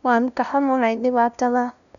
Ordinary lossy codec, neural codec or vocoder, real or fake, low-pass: none; codec, 16 kHz, 0.5 kbps, FunCodec, trained on LibriTTS, 25 frames a second; fake; 7.2 kHz